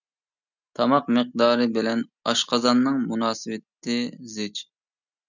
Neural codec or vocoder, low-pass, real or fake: none; 7.2 kHz; real